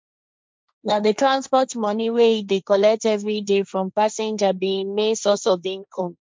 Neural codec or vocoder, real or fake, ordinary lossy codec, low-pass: codec, 16 kHz, 1.1 kbps, Voila-Tokenizer; fake; none; none